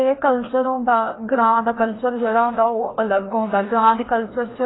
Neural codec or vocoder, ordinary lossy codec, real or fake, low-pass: codec, 16 kHz, 1 kbps, FreqCodec, larger model; AAC, 16 kbps; fake; 7.2 kHz